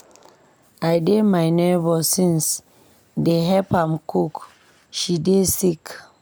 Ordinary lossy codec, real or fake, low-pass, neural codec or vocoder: none; real; none; none